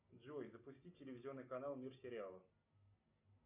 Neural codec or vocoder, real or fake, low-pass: none; real; 3.6 kHz